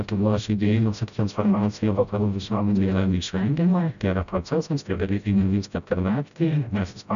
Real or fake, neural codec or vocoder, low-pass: fake; codec, 16 kHz, 0.5 kbps, FreqCodec, smaller model; 7.2 kHz